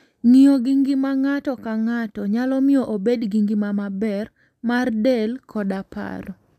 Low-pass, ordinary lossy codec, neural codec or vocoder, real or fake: 14.4 kHz; none; none; real